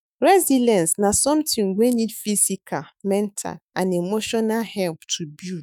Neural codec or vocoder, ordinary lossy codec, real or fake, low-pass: autoencoder, 48 kHz, 128 numbers a frame, DAC-VAE, trained on Japanese speech; none; fake; none